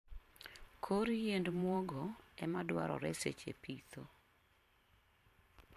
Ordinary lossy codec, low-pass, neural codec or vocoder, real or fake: MP3, 64 kbps; 14.4 kHz; vocoder, 44.1 kHz, 128 mel bands every 512 samples, BigVGAN v2; fake